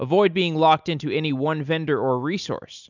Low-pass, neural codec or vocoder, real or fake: 7.2 kHz; none; real